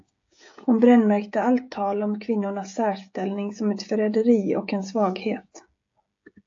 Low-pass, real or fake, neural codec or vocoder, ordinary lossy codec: 7.2 kHz; fake; codec, 16 kHz, 16 kbps, FreqCodec, smaller model; AAC, 48 kbps